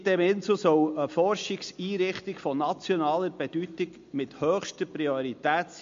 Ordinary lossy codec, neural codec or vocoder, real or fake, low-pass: MP3, 48 kbps; none; real; 7.2 kHz